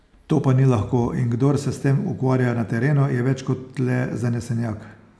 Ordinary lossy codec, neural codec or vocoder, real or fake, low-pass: none; none; real; none